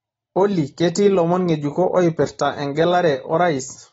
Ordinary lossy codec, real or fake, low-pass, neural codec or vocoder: AAC, 24 kbps; real; 9.9 kHz; none